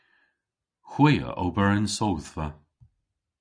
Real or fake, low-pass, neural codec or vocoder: real; 9.9 kHz; none